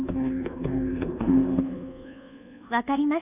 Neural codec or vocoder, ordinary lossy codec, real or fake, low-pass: codec, 24 kHz, 1.2 kbps, DualCodec; none; fake; 3.6 kHz